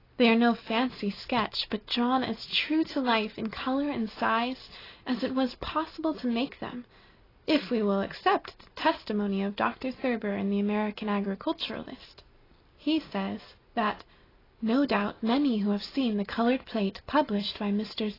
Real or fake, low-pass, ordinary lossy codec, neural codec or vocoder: real; 5.4 kHz; AAC, 24 kbps; none